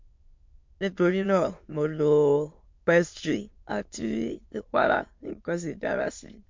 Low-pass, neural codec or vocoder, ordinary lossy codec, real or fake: 7.2 kHz; autoencoder, 22.05 kHz, a latent of 192 numbers a frame, VITS, trained on many speakers; MP3, 48 kbps; fake